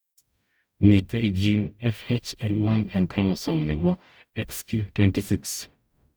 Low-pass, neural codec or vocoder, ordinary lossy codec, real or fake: none; codec, 44.1 kHz, 0.9 kbps, DAC; none; fake